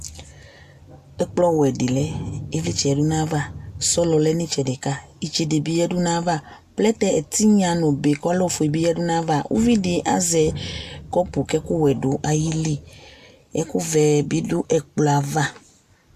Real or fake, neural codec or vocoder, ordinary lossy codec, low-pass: real; none; MP3, 96 kbps; 14.4 kHz